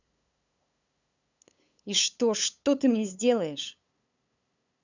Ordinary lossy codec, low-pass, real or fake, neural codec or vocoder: none; 7.2 kHz; fake; codec, 16 kHz, 8 kbps, FunCodec, trained on LibriTTS, 25 frames a second